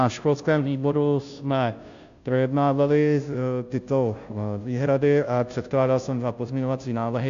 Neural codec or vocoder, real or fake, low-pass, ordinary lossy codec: codec, 16 kHz, 0.5 kbps, FunCodec, trained on Chinese and English, 25 frames a second; fake; 7.2 kHz; AAC, 64 kbps